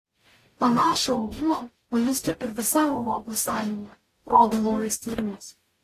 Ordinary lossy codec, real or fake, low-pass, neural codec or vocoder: AAC, 48 kbps; fake; 14.4 kHz; codec, 44.1 kHz, 0.9 kbps, DAC